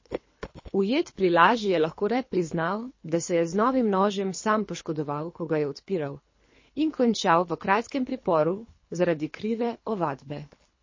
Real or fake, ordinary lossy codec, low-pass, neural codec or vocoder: fake; MP3, 32 kbps; 7.2 kHz; codec, 24 kHz, 3 kbps, HILCodec